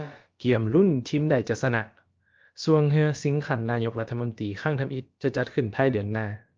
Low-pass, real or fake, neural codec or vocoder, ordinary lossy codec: 7.2 kHz; fake; codec, 16 kHz, about 1 kbps, DyCAST, with the encoder's durations; Opus, 24 kbps